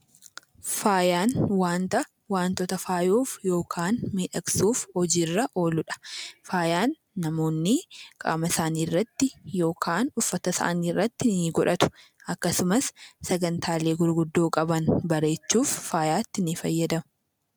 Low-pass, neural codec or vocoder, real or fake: 19.8 kHz; none; real